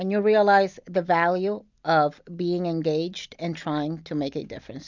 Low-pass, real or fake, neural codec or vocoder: 7.2 kHz; real; none